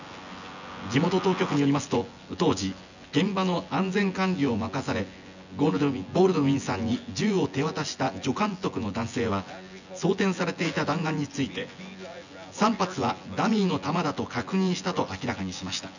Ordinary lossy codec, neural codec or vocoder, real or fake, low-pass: none; vocoder, 24 kHz, 100 mel bands, Vocos; fake; 7.2 kHz